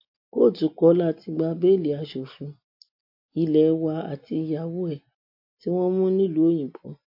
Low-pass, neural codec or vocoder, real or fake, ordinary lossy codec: 5.4 kHz; none; real; MP3, 32 kbps